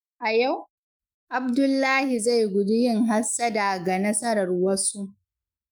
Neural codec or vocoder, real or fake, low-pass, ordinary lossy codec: autoencoder, 48 kHz, 128 numbers a frame, DAC-VAE, trained on Japanese speech; fake; none; none